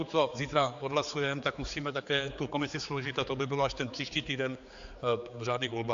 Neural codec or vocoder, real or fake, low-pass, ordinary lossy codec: codec, 16 kHz, 4 kbps, X-Codec, HuBERT features, trained on general audio; fake; 7.2 kHz; AAC, 64 kbps